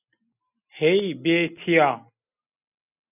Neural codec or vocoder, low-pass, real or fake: none; 3.6 kHz; real